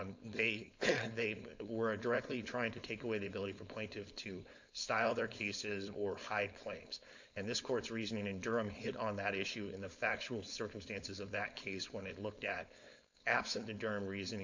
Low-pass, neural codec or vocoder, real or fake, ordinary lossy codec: 7.2 kHz; codec, 16 kHz, 4.8 kbps, FACodec; fake; MP3, 64 kbps